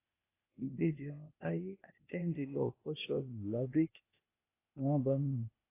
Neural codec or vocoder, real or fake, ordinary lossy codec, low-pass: codec, 16 kHz, 0.8 kbps, ZipCodec; fake; AAC, 24 kbps; 3.6 kHz